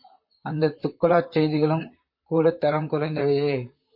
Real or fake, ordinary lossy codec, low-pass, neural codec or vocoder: fake; MP3, 32 kbps; 5.4 kHz; vocoder, 44.1 kHz, 128 mel bands, Pupu-Vocoder